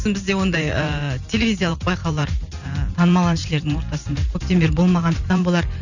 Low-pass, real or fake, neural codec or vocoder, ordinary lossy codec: 7.2 kHz; fake; vocoder, 44.1 kHz, 128 mel bands every 512 samples, BigVGAN v2; none